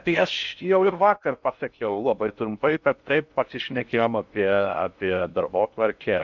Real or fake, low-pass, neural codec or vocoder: fake; 7.2 kHz; codec, 16 kHz in and 24 kHz out, 0.6 kbps, FocalCodec, streaming, 4096 codes